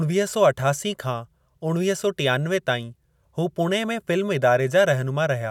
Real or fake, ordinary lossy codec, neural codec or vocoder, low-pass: real; none; none; 19.8 kHz